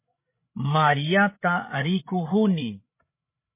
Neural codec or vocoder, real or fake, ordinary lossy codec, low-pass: codec, 16 kHz, 8 kbps, FreqCodec, larger model; fake; MP3, 24 kbps; 3.6 kHz